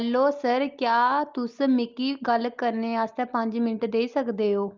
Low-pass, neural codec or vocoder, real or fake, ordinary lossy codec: 7.2 kHz; none; real; Opus, 24 kbps